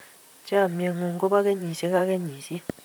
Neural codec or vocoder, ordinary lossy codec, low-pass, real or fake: vocoder, 44.1 kHz, 128 mel bands, Pupu-Vocoder; none; none; fake